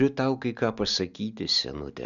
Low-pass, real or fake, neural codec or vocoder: 7.2 kHz; real; none